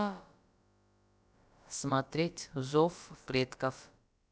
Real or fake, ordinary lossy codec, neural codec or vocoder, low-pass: fake; none; codec, 16 kHz, about 1 kbps, DyCAST, with the encoder's durations; none